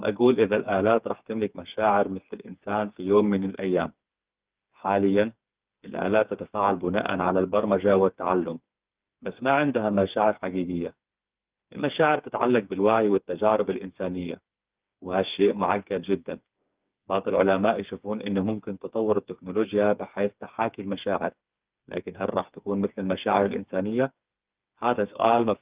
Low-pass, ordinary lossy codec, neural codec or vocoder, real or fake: 3.6 kHz; Opus, 24 kbps; codec, 16 kHz, 4 kbps, FreqCodec, smaller model; fake